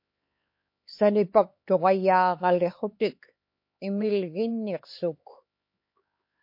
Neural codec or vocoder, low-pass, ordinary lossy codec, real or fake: codec, 16 kHz, 4 kbps, X-Codec, HuBERT features, trained on LibriSpeech; 5.4 kHz; MP3, 32 kbps; fake